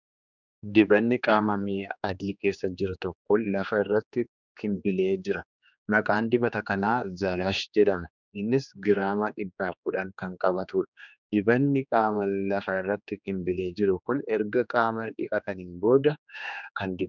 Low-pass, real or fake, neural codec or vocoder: 7.2 kHz; fake; codec, 16 kHz, 2 kbps, X-Codec, HuBERT features, trained on general audio